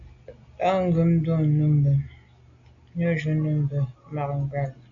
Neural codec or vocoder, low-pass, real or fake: none; 7.2 kHz; real